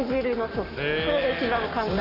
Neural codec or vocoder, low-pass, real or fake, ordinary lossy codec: codec, 44.1 kHz, 7.8 kbps, Pupu-Codec; 5.4 kHz; fake; none